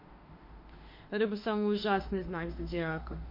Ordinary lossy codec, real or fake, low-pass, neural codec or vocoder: MP3, 32 kbps; fake; 5.4 kHz; autoencoder, 48 kHz, 32 numbers a frame, DAC-VAE, trained on Japanese speech